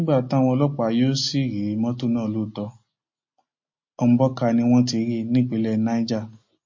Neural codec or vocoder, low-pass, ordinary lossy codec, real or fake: none; 7.2 kHz; MP3, 32 kbps; real